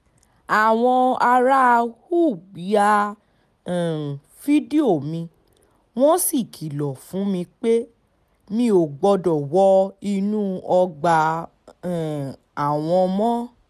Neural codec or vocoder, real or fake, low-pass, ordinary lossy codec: none; real; 14.4 kHz; none